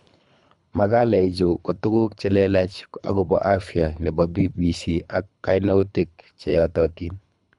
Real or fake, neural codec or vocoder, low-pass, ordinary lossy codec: fake; codec, 24 kHz, 3 kbps, HILCodec; 10.8 kHz; none